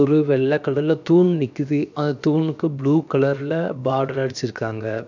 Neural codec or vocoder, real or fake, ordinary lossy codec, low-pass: codec, 16 kHz, 0.7 kbps, FocalCodec; fake; none; 7.2 kHz